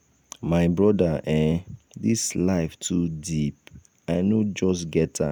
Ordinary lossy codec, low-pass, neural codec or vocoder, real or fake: none; none; none; real